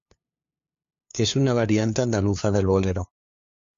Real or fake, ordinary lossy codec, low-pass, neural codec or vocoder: fake; MP3, 48 kbps; 7.2 kHz; codec, 16 kHz, 2 kbps, FunCodec, trained on LibriTTS, 25 frames a second